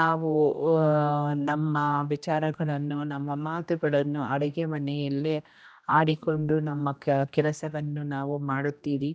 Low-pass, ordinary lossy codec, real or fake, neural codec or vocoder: none; none; fake; codec, 16 kHz, 1 kbps, X-Codec, HuBERT features, trained on general audio